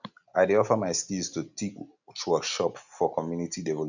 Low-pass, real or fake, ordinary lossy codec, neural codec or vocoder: 7.2 kHz; real; none; none